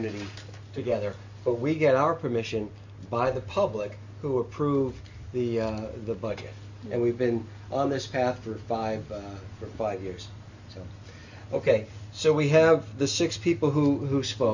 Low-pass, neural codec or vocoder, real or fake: 7.2 kHz; none; real